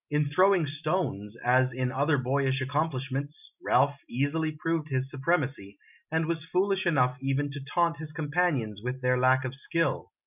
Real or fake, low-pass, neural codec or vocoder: real; 3.6 kHz; none